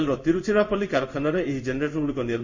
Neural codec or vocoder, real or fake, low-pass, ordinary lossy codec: codec, 16 kHz in and 24 kHz out, 1 kbps, XY-Tokenizer; fake; 7.2 kHz; MP3, 48 kbps